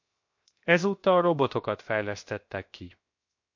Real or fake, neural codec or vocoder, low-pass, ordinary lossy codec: fake; codec, 16 kHz, 0.7 kbps, FocalCodec; 7.2 kHz; MP3, 48 kbps